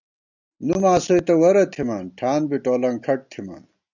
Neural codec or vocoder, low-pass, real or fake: none; 7.2 kHz; real